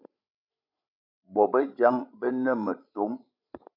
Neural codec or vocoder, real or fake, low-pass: codec, 16 kHz, 16 kbps, FreqCodec, larger model; fake; 5.4 kHz